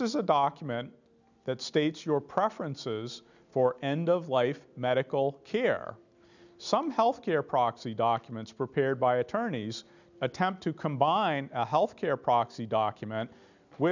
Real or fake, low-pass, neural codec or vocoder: real; 7.2 kHz; none